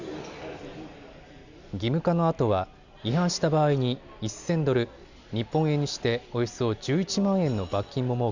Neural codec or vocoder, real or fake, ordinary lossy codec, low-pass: none; real; Opus, 64 kbps; 7.2 kHz